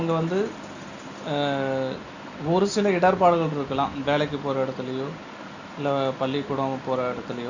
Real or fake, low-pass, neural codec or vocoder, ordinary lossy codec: real; 7.2 kHz; none; Opus, 64 kbps